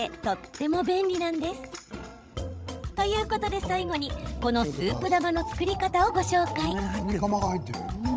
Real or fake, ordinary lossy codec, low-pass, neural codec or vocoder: fake; none; none; codec, 16 kHz, 16 kbps, FreqCodec, larger model